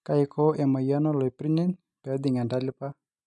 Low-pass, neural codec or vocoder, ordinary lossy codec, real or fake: 10.8 kHz; none; none; real